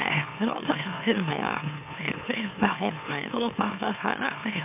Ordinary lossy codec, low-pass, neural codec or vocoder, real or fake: MP3, 32 kbps; 3.6 kHz; autoencoder, 44.1 kHz, a latent of 192 numbers a frame, MeloTTS; fake